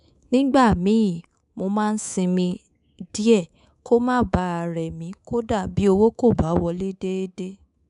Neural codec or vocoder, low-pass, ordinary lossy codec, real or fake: codec, 24 kHz, 3.1 kbps, DualCodec; 10.8 kHz; none; fake